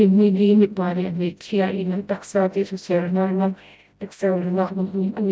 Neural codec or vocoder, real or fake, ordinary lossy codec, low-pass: codec, 16 kHz, 0.5 kbps, FreqCodec, smaller model; fake; none; none